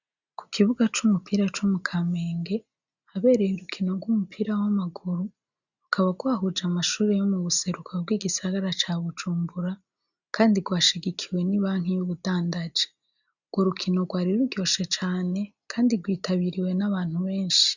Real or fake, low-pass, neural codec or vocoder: real; 7.2 kHz; none